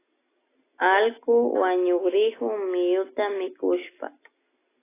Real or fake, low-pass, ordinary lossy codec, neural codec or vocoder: real; 3.6 kHz; AAC, 16 kbps; none